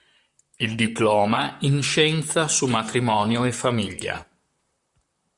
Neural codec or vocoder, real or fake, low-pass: vocoder, 44.1 kHz, 128 mel bands, Pupu-Vocoder; fake; 10.8 kHz